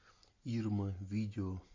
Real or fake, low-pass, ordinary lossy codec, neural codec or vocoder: real; 7.2 kHz; MP3, 48 kbps; none